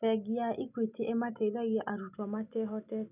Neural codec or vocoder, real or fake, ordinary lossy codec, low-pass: none; real; none; 3.6 kHz